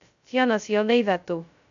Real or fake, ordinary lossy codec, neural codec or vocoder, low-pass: fake; none; codec, 16 kHz, 0.2 kbps, FocalCodec; 7.2 kHz